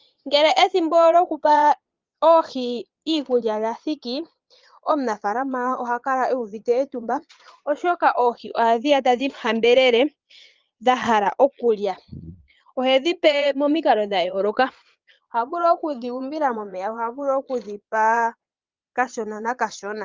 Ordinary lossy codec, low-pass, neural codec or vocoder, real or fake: Opus, 32 kbps; 7.2 kHz; vocoder, 22.05 kHz, 80 mel bands, Vocos; fake